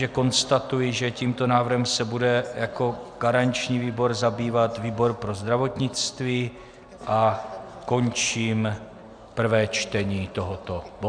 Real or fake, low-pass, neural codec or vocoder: real; 9.9 kHz; none